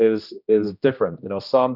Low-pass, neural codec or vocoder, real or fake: 5.4 kHz; codec, 16 kHz, 1 kbps, X-Codec, HuBERT features, trained on general audio; fake